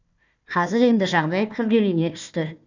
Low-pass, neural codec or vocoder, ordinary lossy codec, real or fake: 7.2 kHz; codec, 16 kHz, 1 kbps, FunCodec, trained on Chinese and English, 50 frames a second; none; fake